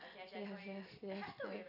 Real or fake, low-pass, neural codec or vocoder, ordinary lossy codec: real; 5.4 kHz; none; none